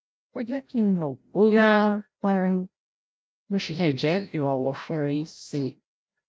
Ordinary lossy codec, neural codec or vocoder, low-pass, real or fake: none; codec, 16 kHz, 0.5 kbps, FreqCodec, larger model; none; fake